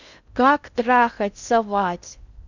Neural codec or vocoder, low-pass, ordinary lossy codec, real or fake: codec, 16 kHz in and 24 kHz out, 0.6 kbps, FocalCodec, streaming, 2048 codes; 7.2 kHz; none; fake